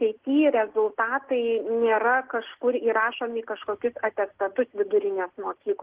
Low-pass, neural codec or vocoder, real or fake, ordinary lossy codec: 3.6 kHz; none; real; Opus, 16 kbps